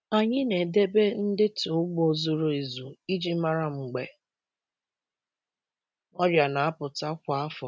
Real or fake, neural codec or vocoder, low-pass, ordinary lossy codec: real; none; none; none